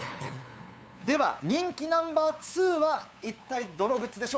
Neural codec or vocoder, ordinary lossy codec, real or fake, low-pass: codec, 16 kHz, 8 kbps, FunCodec, trained on LibriTTS, 25 frames a second; none; fake; none